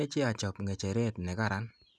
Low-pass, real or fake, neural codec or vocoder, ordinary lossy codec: none; real; none; none